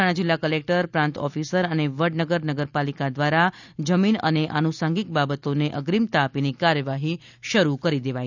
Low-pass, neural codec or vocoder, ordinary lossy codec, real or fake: 7.2 kHz; none; none; real